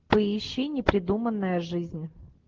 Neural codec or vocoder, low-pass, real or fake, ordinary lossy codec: none; 7.2 kHz; real; Opus, 16 kbps